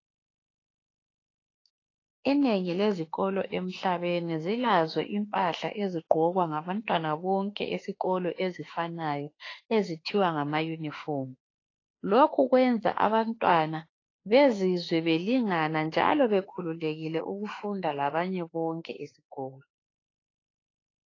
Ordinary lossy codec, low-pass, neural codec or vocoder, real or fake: AAC, 32 kbps; 7.2 kHz; autoencoder, 48 kHz, 32 numbers a frame, DAC-VAE, trained on Japanese speech; fake